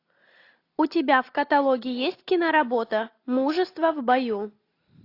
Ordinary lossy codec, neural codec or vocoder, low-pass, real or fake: AAC, 32 kbps; none; 5.4 kHz; real